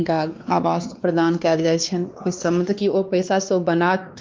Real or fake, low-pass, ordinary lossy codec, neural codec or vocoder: fake; 7.2 kHz; Opus, 24 kbps; codec, 16 kHz, 2 kbps, X-Codec, WavLM features, trained on Multilingual LibriSpeech